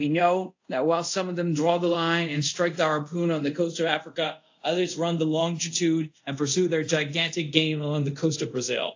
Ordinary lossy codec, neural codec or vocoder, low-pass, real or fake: AAC, 48 kbps; codec, 24 kHz, 0.5 kbps, DualCodec; 7.2 kHz; fake